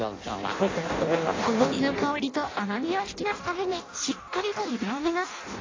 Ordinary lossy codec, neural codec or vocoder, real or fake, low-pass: AAC, 32 kbps; codec, 16 kHz in and 24 kHz out, 0.6 kbps, FireRedTTS-2 codec; fake; 7.2 kHz